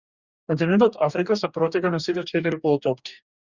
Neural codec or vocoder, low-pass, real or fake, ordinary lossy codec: codec, 44.1 kHz, 2.6 kbps, DAC; 7.2 kHz; fake; Opus, 64 kbps